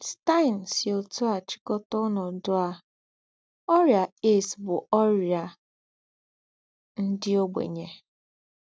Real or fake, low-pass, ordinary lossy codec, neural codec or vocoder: real; none; none; none